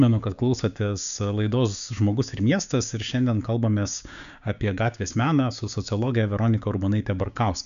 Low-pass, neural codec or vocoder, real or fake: 7.2 kHz; codec, 16 kHz, 6 kbps, DAC; fake